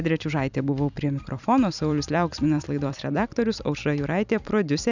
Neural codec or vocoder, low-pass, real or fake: vocoder, 44.1 kHz, 128 mel bands every 256 samples, BigVGAN v2; 7.2 kHz; fake